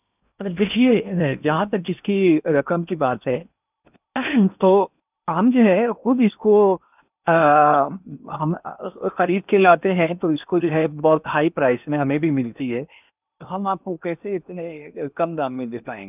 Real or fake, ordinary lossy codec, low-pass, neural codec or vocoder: fake; none; 3.6 kHz; codec, 16 kHz in and 24 kHz out, 0.8 kbps, FocalCodec, streaming, 65536 codes